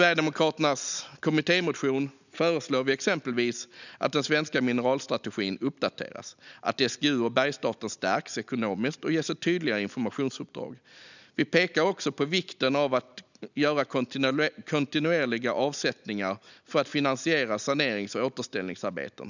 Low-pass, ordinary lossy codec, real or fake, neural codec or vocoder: 7.2 kHz; none; real; none